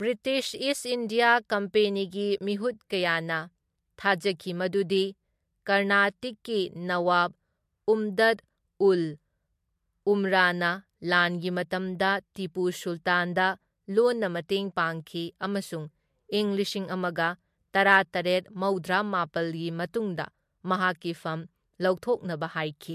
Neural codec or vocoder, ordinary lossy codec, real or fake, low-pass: none; MP3, 64 kbps; real; 14.4 kHz